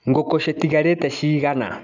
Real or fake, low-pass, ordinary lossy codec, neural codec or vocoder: real; 7.2 kHz; none; none